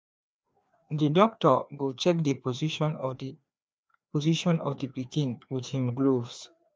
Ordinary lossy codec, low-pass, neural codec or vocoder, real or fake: none; none; codec, 16 kHz, 2 kbps, FreqCodec, larger model; fake